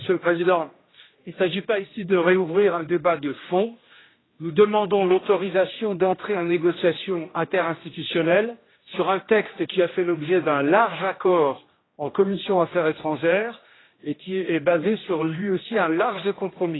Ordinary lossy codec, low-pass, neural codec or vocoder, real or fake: AAC, 16 kbps; 7.2 kHz; codec, 16 kHz, 1 kbps, X-Codec, HuBERT features, trained on general audio; fake